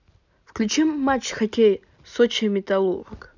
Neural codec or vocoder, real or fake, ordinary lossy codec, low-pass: none; real; none; 7.2 kHz